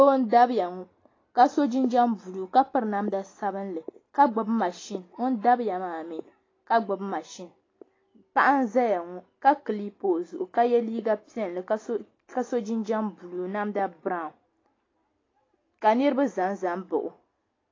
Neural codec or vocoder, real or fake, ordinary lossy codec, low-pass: none; real; AAC, 32 kbps; 7.2 kHz